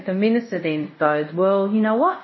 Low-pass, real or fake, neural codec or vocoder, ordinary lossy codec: 7.2 kHz; fake; codec, 24 kHz, 0.5 kbps, DualCodec; MP3, 24 kbps